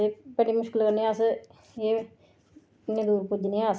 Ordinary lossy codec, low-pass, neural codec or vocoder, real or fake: none; none; none; real